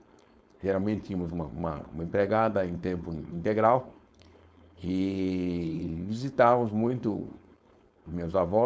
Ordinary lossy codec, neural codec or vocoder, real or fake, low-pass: none; codec, 16 kHz, 4.8 kbps, FACodec; fake; none